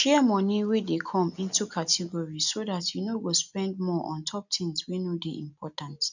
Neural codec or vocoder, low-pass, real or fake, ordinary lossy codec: none; 7.2 kHz; real; none